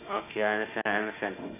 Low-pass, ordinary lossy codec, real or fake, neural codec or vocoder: 3.6 kHz; AAC, 24 kbps; fake; autoencoder, 48 kHz, 32 numbers a frame, DAC-VAE, trained on Japanese speech